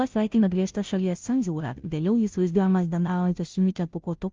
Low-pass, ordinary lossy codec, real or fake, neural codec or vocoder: 7.2 kHz; Opus, 24 kbps; fake; codec, 16 kHz, 0.5 kbps, FunCodec, trained on Chinese and English, 25 frames a second